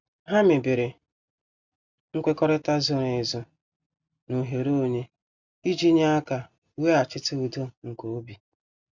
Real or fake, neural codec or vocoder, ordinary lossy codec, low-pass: real; none; Opus, 64 kbps; 7.2 kHz